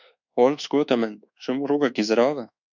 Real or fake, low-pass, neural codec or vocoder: fake; 7.2 kHz; codec, 24 kHz, 1.2 kbps, DualCodec